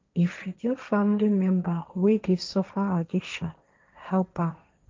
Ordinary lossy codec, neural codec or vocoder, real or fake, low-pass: Opus, 24 kbps; codec, 16 kHz, 1.1 kbps, Voila-Tokenizer; fake; 7.2 kHz